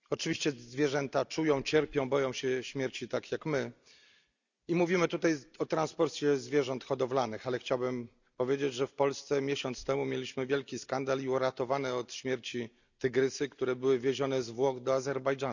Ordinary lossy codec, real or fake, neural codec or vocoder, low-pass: none; real; none; 7.2 kHz